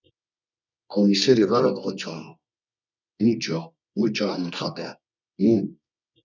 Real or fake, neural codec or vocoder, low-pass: fake; codec, 24 kHz, 0.9 kbps, WavTokenizer, medium music audio release; 7.2 kHz